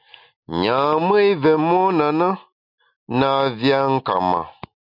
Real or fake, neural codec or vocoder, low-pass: real; none; 5.4 kHz